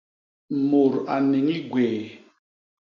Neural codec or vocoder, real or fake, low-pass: none; real; 7.2 kHz